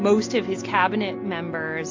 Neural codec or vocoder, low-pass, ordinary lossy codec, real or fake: none; 7.2 kHz; AAC, 48 kbps; real